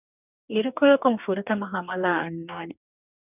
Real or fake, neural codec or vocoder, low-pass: fake; codec, 44.1 kHz, 2.6 kbps, DAC; 3.6 kHz